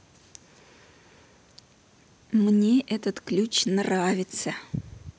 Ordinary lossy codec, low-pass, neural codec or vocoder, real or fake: none; none; none; real